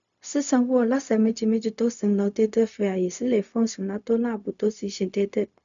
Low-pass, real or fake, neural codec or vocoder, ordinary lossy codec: 7.2 kHz; fake; codec, 16 kHz, 0.4 kbps, LongCat-Audio-Codec; none